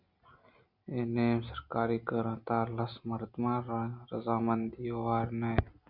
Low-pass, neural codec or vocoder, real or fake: 5.4 kHz; none; real